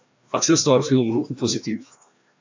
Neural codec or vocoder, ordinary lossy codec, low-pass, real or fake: codec, 16 kHz, 1 kbps, FreqCodec, larger model; AAC, 48 kbps; 7.2 kHz; fake